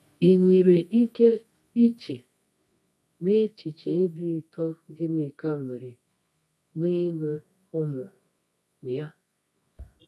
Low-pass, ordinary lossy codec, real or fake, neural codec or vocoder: none; none; fake; codec, 24 kHz, 0.9 kbps, WavTokenizer, medium music audio release